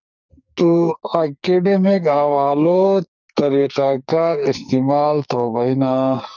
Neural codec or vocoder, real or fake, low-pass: codec, 44.1 kHz, 2.6 kbps, SNAC; fake; 7.2 kHz